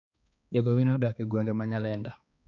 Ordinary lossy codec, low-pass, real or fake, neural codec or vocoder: none; 7.2 kHz; fake; codec, 16 kHz, 2 kbps, X-Codec, HuBERT features, trained on balanced general audio